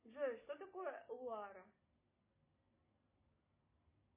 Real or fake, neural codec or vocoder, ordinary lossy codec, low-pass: real; none; MP3, 16 kbps; 3.6 kHz